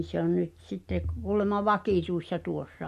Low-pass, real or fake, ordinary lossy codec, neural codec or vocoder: 14.4 kHz; real; MP3, 64 kbps; none